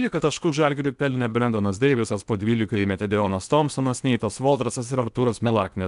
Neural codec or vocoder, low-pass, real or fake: codec, 16 kHz in and 24 kHz out, 0.8 kbps, FocalCodec, streaming, 65536 codes; 10.8 kHz; fake